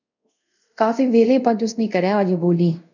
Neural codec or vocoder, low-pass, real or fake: codec, 24 kHz, 0.5 kbps, DualCodec; 7.2 kHz; fake